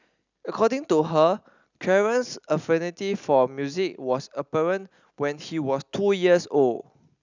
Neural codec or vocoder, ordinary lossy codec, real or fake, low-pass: none; none; real; 7.2 kHz